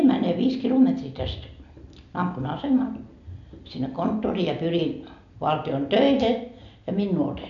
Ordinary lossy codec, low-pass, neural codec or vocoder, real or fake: none; 7.2 kHz; none; real